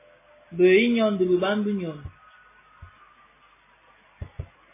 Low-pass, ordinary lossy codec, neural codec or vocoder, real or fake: 3.6 kHz; MP3, 16 kbps; none; real